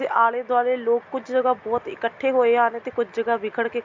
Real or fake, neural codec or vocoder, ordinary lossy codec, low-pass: real; none; MP3, 64 kbps; 7.2 kHz